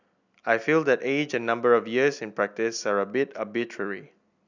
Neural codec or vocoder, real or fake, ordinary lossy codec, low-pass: none; real; none; 7.2 kHz